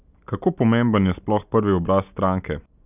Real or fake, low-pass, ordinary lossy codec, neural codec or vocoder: real; 3.6 kHz; none; none